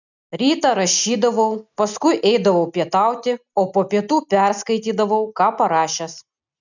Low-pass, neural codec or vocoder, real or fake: 7.2 kHz; none; real